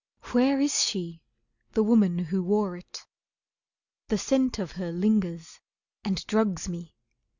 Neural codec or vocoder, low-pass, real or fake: none; 7.2 kHz; real